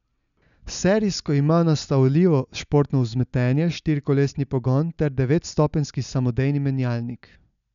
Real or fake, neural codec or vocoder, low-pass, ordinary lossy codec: real; none; 7.2 kHz; none